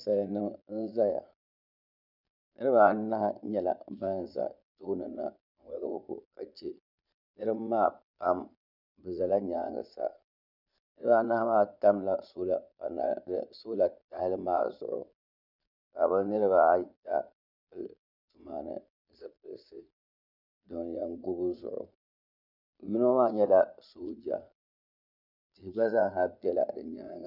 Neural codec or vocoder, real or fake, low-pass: vocoder, 22.05 kHz, 80 mel bands, Vocos; fake; 5.4 kHz